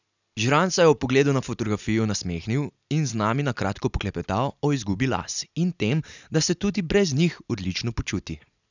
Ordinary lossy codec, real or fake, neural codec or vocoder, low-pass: none; real; none; 7.2 kHz